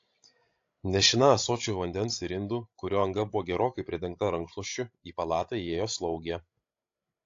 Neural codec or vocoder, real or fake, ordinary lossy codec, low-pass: codec, 16 kHz, 16 kbps, FreqCodec, larger model; fake; AAC, 48 kbps; 7.2 kHz